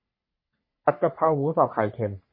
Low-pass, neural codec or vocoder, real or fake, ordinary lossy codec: 5.4 kHz; codec, 44.1 kHz, 3.4 kbps, Pupu-Codec; fake; MP3, 24 kbps